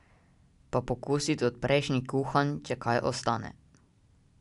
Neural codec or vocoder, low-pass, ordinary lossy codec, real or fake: none; 10.8 kHz; none; real